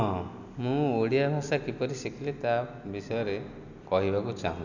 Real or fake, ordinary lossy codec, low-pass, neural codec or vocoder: real; none; 7.2 kHz; none